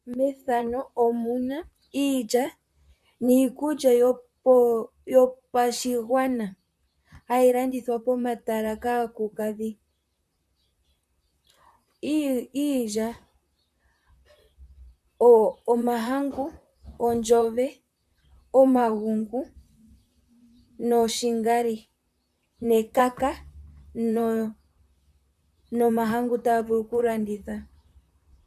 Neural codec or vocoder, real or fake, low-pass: vocoder, 44.1 kHz, 128 mel bands, Pupu-Vocoder; fake; 14.4 kHz